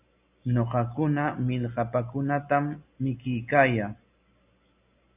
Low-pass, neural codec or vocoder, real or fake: 3.6 kHz; none; real